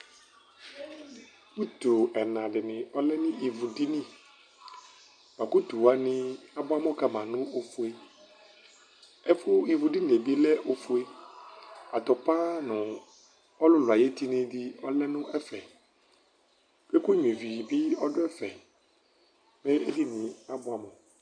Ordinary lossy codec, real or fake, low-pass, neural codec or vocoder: MP3, 64 kbps; real; 9.9 kHz; none